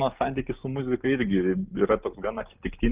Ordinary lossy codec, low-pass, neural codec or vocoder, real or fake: Opus, 32 kbps; 3.6 kHz; none; real